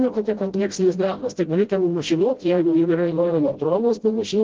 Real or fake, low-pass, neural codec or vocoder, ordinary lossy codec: fake; 7.2 kHz; codec, 16 kHz, 0.5 kbps, FreqCodec, smaller model; Opus, 16 kbps